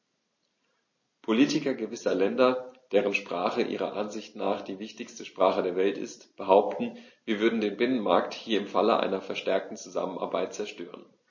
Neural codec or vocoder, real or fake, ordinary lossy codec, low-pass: none; real; MP3, 32 kbps; 7.2 kHz